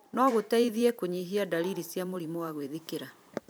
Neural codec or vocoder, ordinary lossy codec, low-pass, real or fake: vocoder, 44.1 kHz, 128 mel bands every 512 samples, BigVGAN v2; none; none; fake